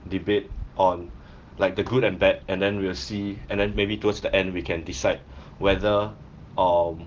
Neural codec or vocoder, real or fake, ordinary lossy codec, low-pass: none; real; Opus, 16 kbps; 7.2 kHz